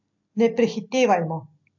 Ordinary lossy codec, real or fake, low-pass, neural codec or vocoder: AAC, 48 kbps; real; 7.2 kHz; none